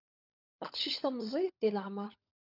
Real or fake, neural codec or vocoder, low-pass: fake; codec, 16 kHz, 16 kbps, FunCodec, trained on LibriTTS, 50 frames a second; 5.4 kHz